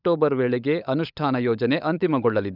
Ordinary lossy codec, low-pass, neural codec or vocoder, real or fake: none; 5.4 kHz; codec, 16 kHz, 16 kbps, FunCodec, trained on LibriTTS, 50 frames a second; fake